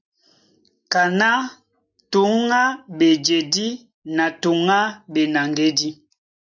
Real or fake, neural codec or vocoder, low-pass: real; none; 7.2 kHz